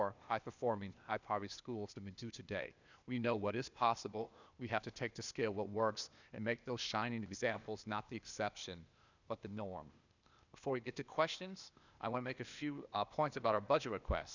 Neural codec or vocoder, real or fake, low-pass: codec, 16 kHz, 0.8 kbps, ZipCodec; fake; 7.2 kHz